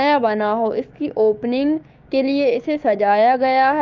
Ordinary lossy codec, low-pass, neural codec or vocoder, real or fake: Opus, 24 kbps; 7.2 kHz; codec, 16 kHz, 6 kbps, DAC; fake